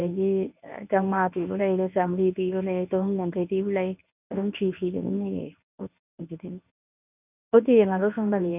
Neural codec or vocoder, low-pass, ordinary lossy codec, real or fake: codec, 24 kHz, 0.9 kbps, WavTokenizer, medium speech release version 1; 3.6 kHz; none; fake